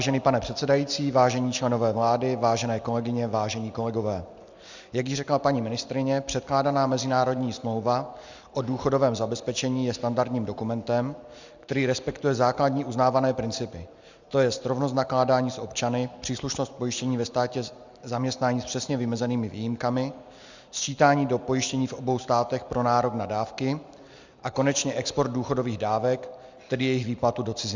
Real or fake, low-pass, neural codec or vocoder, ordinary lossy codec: real; 7.2 kHz; none; Opus, 64 kbps